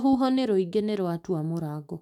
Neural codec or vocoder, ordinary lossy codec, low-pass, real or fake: autoencoder, 48 kHz, 128 numbers a frame, DAC-VAE, trained on Japanese speech; none; 19.8 kHz; fake